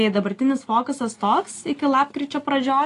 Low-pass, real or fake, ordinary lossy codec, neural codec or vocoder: 10.8 kHz; real; AAC, 48 kbps; none